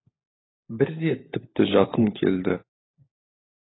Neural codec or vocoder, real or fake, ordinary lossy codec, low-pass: codec, 16 kHz, 16 kbps, FunCodec, trained on LibriTTS, 50 frames a second; fake; AAC, 16 kbps; 7.2 kHz